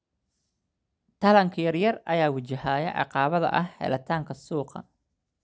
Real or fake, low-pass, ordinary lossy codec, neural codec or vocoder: real; none; none; none